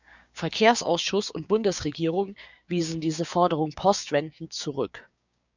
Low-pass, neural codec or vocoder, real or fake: 7.2 kHz; codec, 16 kHz, 6 kbps, DAC; fake